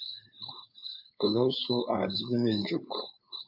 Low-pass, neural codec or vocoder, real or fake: 5.4 kHz; codec, 16 kHz, 4.8 kbps, FACodec; fake